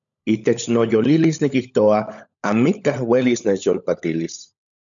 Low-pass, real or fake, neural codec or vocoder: 7.2 kHz; fake; codec, 16 kHz, 16 kbps, FunCodec, trained on LibriTTS, 50 frames a second